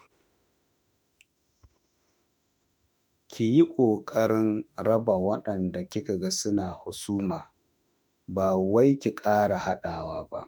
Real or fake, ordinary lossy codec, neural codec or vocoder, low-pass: fake; none; autoencoder, 48 kHz, 32 numbers a frame, DAC-VAE, trained on Japanese speech; none